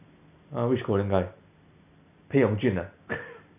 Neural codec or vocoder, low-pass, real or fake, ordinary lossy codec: none; 3.6 kHz; real; none